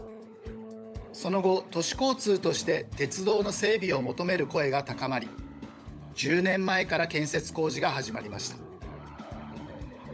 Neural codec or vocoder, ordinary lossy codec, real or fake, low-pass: codec, 16 kHz, 16 kbps, FunCodec, trained on LibriTTS, 50 frames a second; none; fake; none